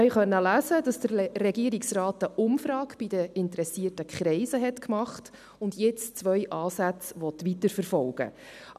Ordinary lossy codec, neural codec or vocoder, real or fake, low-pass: none; none; real; 14.4 kHz